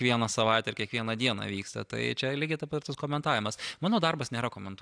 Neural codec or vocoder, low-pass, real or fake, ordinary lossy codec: none; 9.9 kHz; real; AAC, 64 kbps